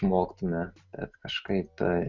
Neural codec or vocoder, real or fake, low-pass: none; real; 7.2 kHz